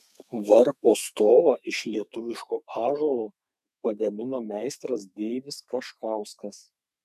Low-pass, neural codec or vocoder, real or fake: 14.4 kHz; codec, 32 kHz, 1.9 kbps, SNAC; fake